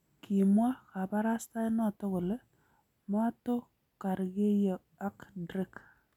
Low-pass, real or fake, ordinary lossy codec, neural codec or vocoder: 19.8 kHz; real; none; none